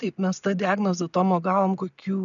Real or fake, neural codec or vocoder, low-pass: real; none; 7.2 kHz